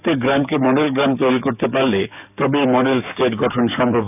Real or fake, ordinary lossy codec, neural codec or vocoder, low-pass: fake; none; codec, 44.1 kHz, 7.8 kbps, DAC; 3.6 kHz